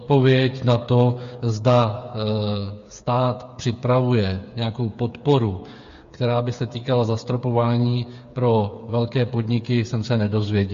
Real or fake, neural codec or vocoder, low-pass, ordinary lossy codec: fake; codec, 16 kHz, 8 kbps, FreqCodec, smaller model; 7.2 kHz; MP3, 48 kbps